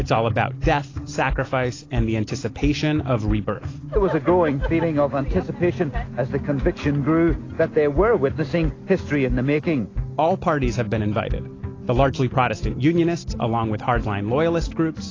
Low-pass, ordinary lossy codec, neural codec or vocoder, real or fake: 7.2 kHz; AAC, 32 kbps; none; real